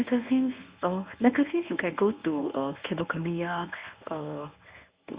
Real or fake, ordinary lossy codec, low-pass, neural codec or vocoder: fake; Opus, 64 kbps; 3.6 kHz; codec, 24 kHz, 0.9 kbps, WavTokenizer, medium speech release version 1